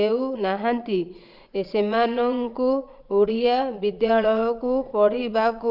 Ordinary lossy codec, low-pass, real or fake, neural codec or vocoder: none; 5.4 kHz; fake; vocoder, 22.05 kHz, 80 mel bands, Vocos